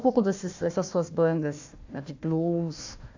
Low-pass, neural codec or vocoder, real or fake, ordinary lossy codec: 7.2 kHz; codec, 16 kHz, 1 kbps, FunCodec, trained on Chinese and English, 50 frames a second; fake; AAC, 48 kbps